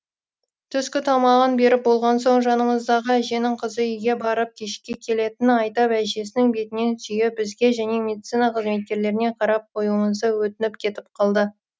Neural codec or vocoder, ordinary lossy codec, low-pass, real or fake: none; none; none; real